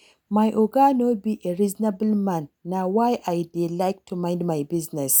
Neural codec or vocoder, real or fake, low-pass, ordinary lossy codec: none; real; none; none